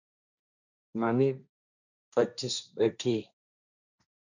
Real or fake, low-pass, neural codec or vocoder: fake; 7.2 kHz; codec, 16 kHz, 1.1 kbps, Voila-Tokenizer